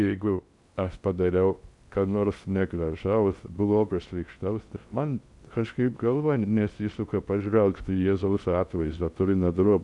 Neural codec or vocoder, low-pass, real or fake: codec, 16 kHz in and 24 kHz out, 0.6 kbps, FocalCodec, streaming, 2048 codes; 10.8 kHz; fake